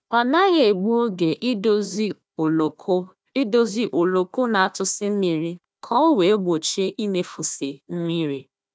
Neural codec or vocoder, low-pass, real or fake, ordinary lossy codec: codec, 16 kHz, 1 kbps, FunCodec, trained on Chinese and English, 50 frames a second; none; fake; none